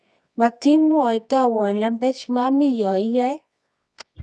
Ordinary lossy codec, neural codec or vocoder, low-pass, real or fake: none; codec, 24 kHz, 0.9 kbps, WavTokenizer, medium music audio release; none; fake